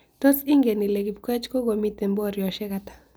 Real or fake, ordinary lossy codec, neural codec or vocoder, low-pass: real; none; none; none